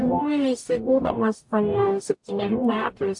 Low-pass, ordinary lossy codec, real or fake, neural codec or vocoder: 10.8 kHz; AAC, 64 kbps; fake; codec, 44.1 kHz, 0.9 kbps, DAC